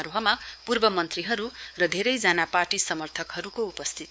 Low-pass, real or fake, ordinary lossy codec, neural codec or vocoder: none; fake; none; codec, 16 kHz, 4 kbps, X-Codec, WavLM features, trained on Multilingual LibriSpeech